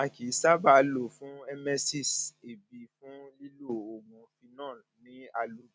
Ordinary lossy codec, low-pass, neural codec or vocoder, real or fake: none; none; none; real